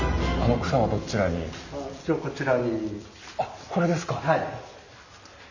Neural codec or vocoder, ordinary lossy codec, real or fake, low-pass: none; none; real; 7.2 kHz